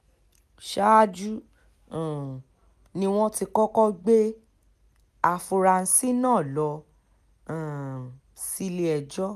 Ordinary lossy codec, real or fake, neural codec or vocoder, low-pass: none; real; none; 14.4 kHz